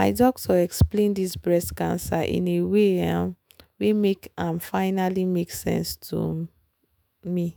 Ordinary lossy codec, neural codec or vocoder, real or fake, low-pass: none; autoencoder, 48 kHz, 128 numbers a frame, DAC-VAE, trained on Japanese speech; fake; none